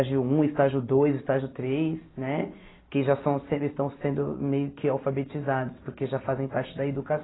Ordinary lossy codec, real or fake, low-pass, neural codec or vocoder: AAC, 16 kbps; real; 7.2 kHz; none